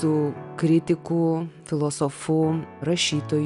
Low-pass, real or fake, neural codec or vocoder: 10.8 kHz; real; none